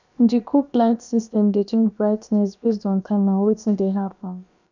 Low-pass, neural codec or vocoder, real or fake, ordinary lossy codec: 7.2 kHz; codec, 16 kHz, about 1 kbps, DyCAST, with the encoder's durations; fake; none